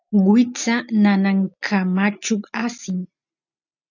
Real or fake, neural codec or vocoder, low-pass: real; none; 7.2 kHz